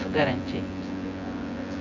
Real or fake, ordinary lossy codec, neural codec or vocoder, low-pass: fake; none; vocoder, 24 kHz, 100 mel bands, Vocos; 7.2 kHz